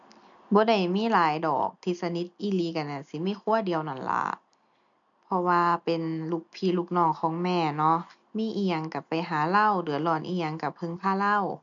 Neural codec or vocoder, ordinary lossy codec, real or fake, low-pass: none; none; real; 7.2 kHz